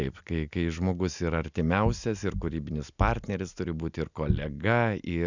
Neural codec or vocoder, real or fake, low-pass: none; real; 7.2 kHz